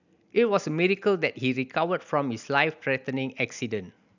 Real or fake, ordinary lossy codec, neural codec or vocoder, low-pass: real; none; none; 7.2 kHz